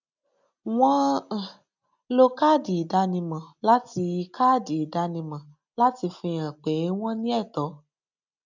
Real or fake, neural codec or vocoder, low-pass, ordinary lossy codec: real; none; 7.2 kHz; none